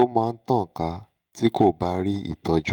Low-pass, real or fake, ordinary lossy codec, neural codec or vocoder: 19.8 kHz; fake; Opus, 32 kbps; autoencoder, 48 kHz, 128 numbers a frame, DAC-VAE, trained on Japanese speech